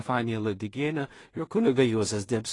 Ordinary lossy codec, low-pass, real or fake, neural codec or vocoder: AAC, 32 kbps; 10.8 kHz; fake; codec, 16 kHz in and 24 kHz out, 0.4 kbps, LongCat-Audio-Codec, two codebook decoder